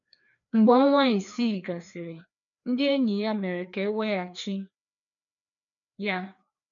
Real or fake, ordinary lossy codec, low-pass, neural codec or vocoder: fake; none; 7.2 kHz; codec, 16 kHz, 2 kbps, FreqCodec, larger model